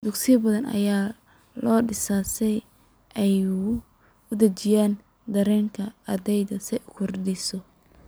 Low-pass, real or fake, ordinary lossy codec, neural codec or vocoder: none; fake; none; vocoder, 44.1 kHz, 128 mel bands every 256 samples, BigVGAN v2